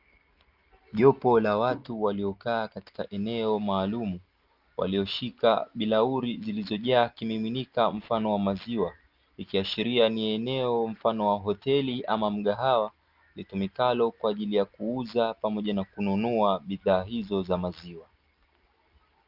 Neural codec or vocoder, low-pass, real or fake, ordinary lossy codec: none; 5.4 kHz; real; Opus, 32 kbps